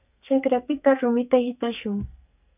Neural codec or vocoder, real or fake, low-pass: codec, 44.1 kHz, 2.6 kbps, SNAC; fake; 3.6 kHz